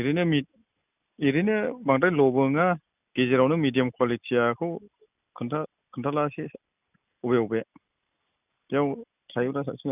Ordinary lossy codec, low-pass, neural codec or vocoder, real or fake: none; 3.6 kHz; none; real